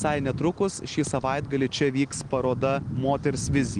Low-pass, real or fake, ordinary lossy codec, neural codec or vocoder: 9.9 kHz; real; Opus, 24 kbps; none